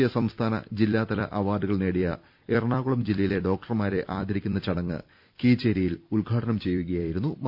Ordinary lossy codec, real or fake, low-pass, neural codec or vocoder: none; fake; 5.4 kHz; vocoder, 44.1 kHz, 128 mel bands every 256 samples, BigVGAN v2